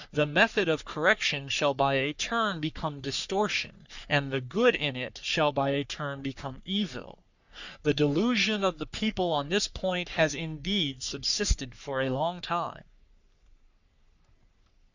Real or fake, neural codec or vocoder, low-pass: fake; codec, 44.1 kHz, 3.4 kbps, Pupu-Codec; 7.2 kHz